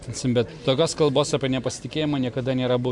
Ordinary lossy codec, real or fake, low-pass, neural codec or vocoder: MP3, 64 kbps; real; 10.8 kHz; none